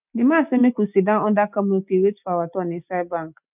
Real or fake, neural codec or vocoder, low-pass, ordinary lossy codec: real; none; 3.6 kHz; none